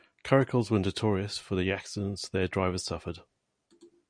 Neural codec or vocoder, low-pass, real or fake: none; 9.9 kHz; real